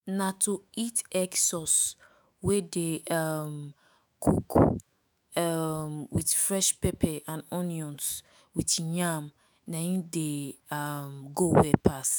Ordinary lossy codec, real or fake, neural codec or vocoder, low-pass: none; fake; autoencoder, 48 kHz, 128 numbers a frame, DAC-VAE, trained on Japanese speech; none